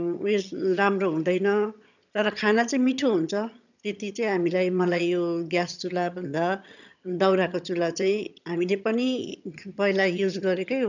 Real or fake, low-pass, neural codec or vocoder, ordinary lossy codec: fake; 7.2 kHz; vocoder, 22.05 kHz, 80 mel bands, HiFi-GAN; none